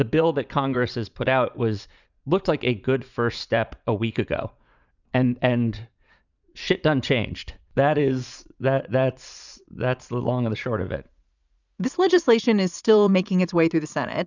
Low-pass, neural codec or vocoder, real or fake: 7.2 kHz; vocoder, 44.1 kHz, 80 mel bands, Vocos; fake